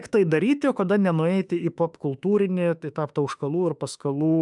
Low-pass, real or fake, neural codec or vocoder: 10.8 kHz; fake; autoencoder, 48 kHz, 32 numbers a frame, DAC-VAE, trained on Japanese speech